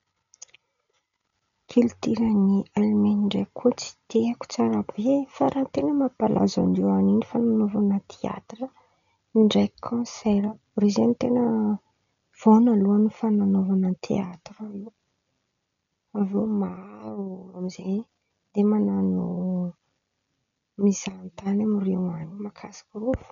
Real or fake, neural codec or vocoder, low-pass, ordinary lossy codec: real; none; 7.2 kHz; none